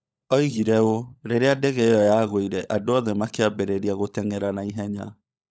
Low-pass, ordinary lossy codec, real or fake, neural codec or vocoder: none; none; fake; codec, 16 kHz, 16 kbps, FunCodec, trained on LibriTTS, 50 frames a second